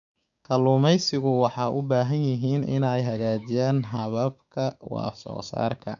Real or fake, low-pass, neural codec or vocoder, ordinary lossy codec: fake; 7.2 kHz; codec, 16 kHz, 6 kbps, DAC; none